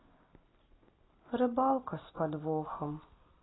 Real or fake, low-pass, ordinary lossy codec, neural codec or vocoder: real; 7.2 kHz; AAC, 16 kbps; none